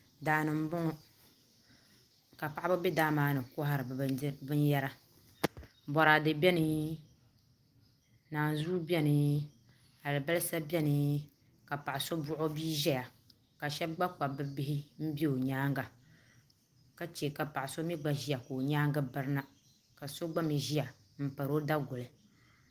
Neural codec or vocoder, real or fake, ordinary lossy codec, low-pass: none; real; Opus, 24 kbps; 14.4 kHz